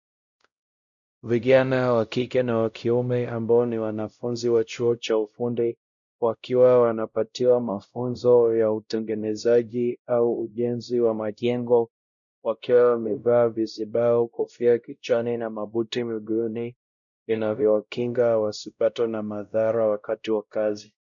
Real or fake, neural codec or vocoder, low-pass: fake; codec, 16 kHz, 0.5 kbps, X-Codec, WavLM features, trained on Multilingual LibriSpeech; 7.2 kHz